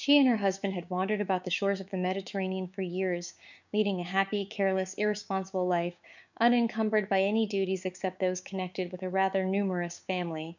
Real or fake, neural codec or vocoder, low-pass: fake; codec, 16 kHz, 6 kbps, DAC; 7.2 kHz